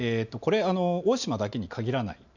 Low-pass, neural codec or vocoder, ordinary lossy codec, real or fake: 7.2 kHz; none; none; real